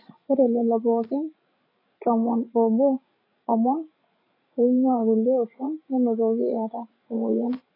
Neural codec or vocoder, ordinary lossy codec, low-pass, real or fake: codec, 16 kHz, 16 kbps, FreqCodec, larger model; AAC, 24 kbps; 5.4 kHz; fake